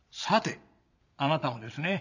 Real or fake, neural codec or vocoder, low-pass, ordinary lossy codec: fake; vocoder, 22.05 kHz, 80 mel bands, Vocos; 7.2 kHz; none